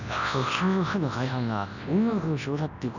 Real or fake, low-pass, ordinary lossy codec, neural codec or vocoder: fake; 7.2 kHz; none; codec, 24 kHz, 0.9 kbps, WavTokenizer, large speech release